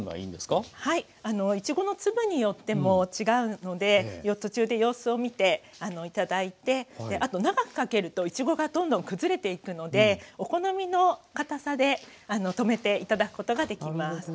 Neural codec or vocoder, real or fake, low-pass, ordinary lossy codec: none; real; none; none